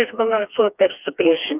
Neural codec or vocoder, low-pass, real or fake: codec, 16 kHz, 2 kbps, FreqCodec, smaller model; 3.6 kHz; fake